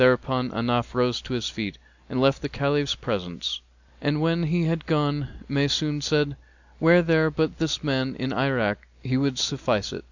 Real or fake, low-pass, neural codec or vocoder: real; 7.2 kHz; none